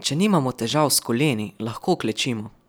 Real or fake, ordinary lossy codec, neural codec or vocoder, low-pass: real; none; none; none